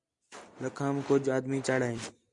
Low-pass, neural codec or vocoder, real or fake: 10.8 kHz; none; real